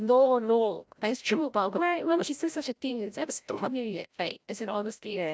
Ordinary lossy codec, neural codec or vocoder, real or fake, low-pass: none; codec, 16 kHz, 0.5 kbps, FreqCodec, larger model; fake; none